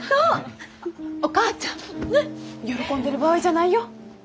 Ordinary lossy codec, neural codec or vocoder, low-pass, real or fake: none; none; none; real